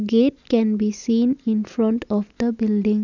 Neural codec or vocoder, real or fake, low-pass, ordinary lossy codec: none; real; 7.2 kHz; none